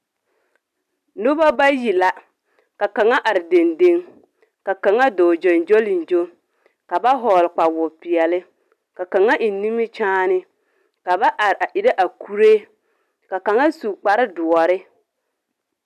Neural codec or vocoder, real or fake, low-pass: none; real; 14.4 kHz